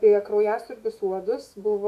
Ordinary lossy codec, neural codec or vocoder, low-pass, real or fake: AAC, 96 kbps; autoencoder, 48 kHz, 128 numbers a frame, DAC-VAE, trained on Japanese speech; 14.4 kHz; fake